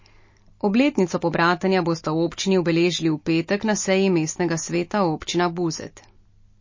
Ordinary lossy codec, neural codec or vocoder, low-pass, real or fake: MP3, 32 kbps; none; 7.2 kHz; real